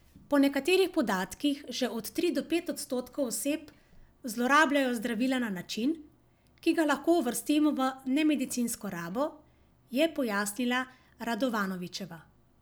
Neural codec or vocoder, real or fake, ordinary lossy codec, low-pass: none; real; none; none